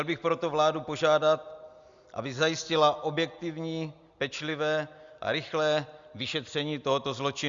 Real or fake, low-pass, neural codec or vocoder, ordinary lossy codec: real; 7.2 kHz; none; Opus, 64 kbps